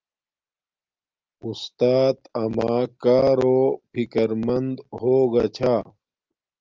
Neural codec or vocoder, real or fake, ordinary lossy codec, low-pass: none; real; Opus, 32 kbps; 7.2 kHz